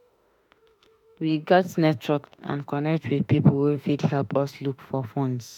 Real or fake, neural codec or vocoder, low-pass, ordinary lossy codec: fake; autoencoder, 48 kHz, 32 numbers a frame, DAC-VAE, trained on Japanese speech; 19.8 kHz; none